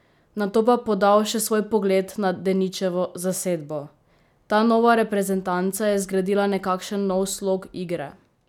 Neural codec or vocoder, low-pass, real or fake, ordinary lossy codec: none; 19.8 kHz; real; none